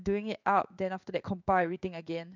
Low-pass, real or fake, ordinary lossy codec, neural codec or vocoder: 7.2 kHz; fake; none; codec, 16 kHz in and 24 kHz out, 1 kbps, XY-Tokenizer